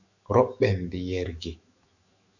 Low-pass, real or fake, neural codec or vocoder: 7.2 kHz; fake; autoencoder, 48 kHz, 128 numbers a frame, DAC-VAE, trained on Japanese speech